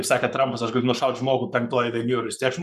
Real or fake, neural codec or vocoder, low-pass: fake; codec, 44.1 kHz, 7.8 kbps, Pupu-Codec; 14.4 kHz